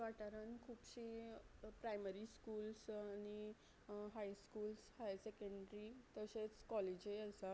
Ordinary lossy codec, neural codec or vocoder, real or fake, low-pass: none; none; real; none